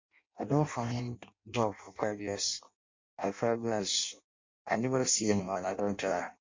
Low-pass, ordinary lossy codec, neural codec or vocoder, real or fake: 7.2 kHz; MP3, 48 kbps; codec, 16 kHz in and 24 kHz out, 0.6 kbps, FireRedTTS-2 codec; fake